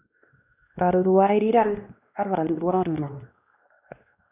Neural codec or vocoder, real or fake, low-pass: codec, 16 kHz, 1 kbps, X-Codec, WavLM features, trained on Multilingual LibriSpeech; fake; 3.6 kHz